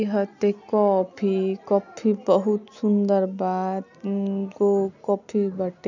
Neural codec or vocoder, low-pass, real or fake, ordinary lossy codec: none; 7.2 kHz; real; none